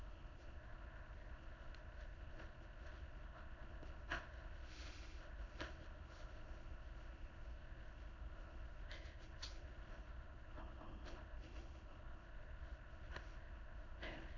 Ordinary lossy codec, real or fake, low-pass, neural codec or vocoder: AAC, 48 kbps; fake; 7.2 kHz; autoencoder, 22.05 kHz, a latent of 192 numbers a frame, VITS, trained on many speakers